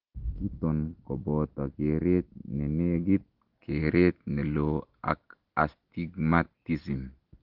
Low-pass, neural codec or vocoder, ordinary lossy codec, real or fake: 5.4 kHz; none; Opus, 16 kbps; real